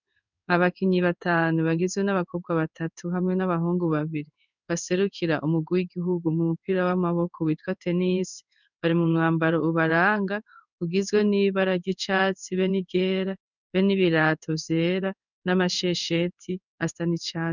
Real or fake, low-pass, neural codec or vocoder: fake; 7.2 kHz; codec, 16 kHz in and 24 kHz out, 1 kbps, XY-Tokenizer